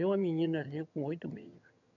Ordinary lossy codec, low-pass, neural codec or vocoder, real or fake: none; 7.2 kHz; vocoder, 22.05 kHz, 80 mel bands, HiFi-GAN; fake